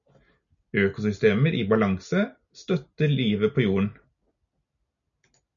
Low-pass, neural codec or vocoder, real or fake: 7.2 kHz; none; real